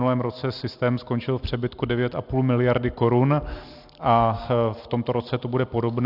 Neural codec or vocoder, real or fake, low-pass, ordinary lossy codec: none; real; 5.4 kHz; AAC, 48 kbps